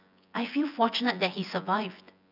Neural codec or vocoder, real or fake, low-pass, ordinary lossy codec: vocoder, 24 kHz, 100 mel bands, Vocos; fake; 5.4 kHz; none